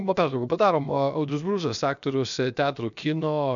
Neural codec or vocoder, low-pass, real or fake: codec, 16 kHz, 0.7 kbps, FocalCodec; 7.2 kHz; fake